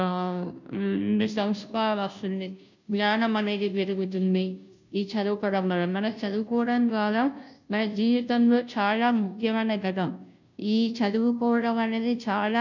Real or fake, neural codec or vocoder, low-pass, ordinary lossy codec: fake; codec, 16 kHz, 0.5 kbps, FunCodec, trained on Chinese and English, 25 frames a second; 7.2 kHz; none